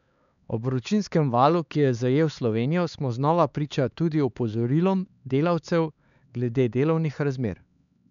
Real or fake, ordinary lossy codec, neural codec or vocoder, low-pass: fake; none; codec, 16 kHz, 4 kbps, X-Codec, HuBERT features, trained on LibriSpeech; 7.2 kHz